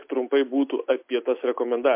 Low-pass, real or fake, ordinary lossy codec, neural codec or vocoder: 3.6 kHz; real; MP3, 32 kbps; none